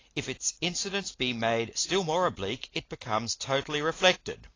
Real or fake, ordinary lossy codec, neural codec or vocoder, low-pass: real; AAC, 32 kbps; none; 7.2 kHz